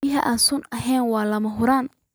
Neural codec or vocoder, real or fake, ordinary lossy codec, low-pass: none; real; none; none